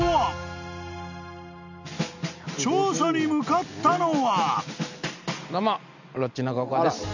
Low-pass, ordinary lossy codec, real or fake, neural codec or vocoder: 7.2 kHz; none; real; none